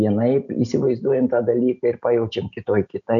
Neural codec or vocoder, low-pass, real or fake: none; 7.2 kHz; real